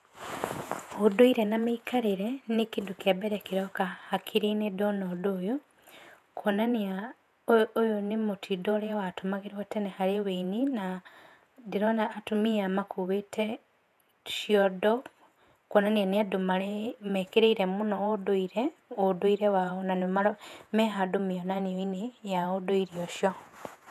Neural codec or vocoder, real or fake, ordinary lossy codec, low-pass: vocoder, 48 kHz, 128 mel bands, Vocos; fake; none; 14.4 kHz